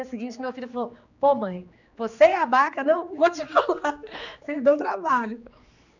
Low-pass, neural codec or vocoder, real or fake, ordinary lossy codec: 7.2 kHz; codec, 16 kHz, 2 kbps, X-Codec, HuBERT features, trained on general audio; fake; none